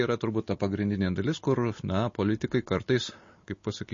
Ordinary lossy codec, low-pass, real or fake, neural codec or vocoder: MP3, 32 kbps; 7.2 kHz; real; none